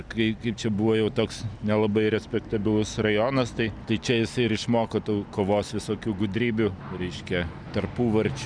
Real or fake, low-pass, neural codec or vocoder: real; 9.9 kHz; none